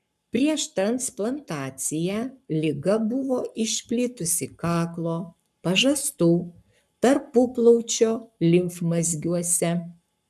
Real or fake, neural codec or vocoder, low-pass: fake; codec, 44.1 kHz, 7.8 kbps, Pupu-Codec; 14.4 kHz